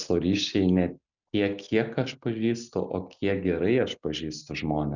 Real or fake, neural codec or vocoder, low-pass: real; none; 7.2 kHz